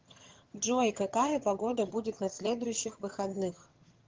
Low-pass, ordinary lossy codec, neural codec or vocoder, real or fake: 7.2 kHz; Opus, 16 kbps; vocoder, 22.05 kHz, 80 mel bands, HiFi-GAN; fake